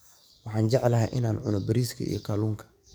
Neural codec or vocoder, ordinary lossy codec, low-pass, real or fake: codec, 44.1 kHz, 7.8 kbps, DAC; none; none; fake